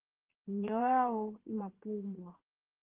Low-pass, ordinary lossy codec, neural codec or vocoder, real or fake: 3.6 kHz; Opus, 16 kbps; vocoder, 22.05 kHz, 80 mel bands, Vocos; fake